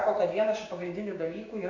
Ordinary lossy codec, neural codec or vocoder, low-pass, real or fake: AAC, 48 kbps; codec, 16 kHz, 6 kbps, DAC; 7.2 kHz; fake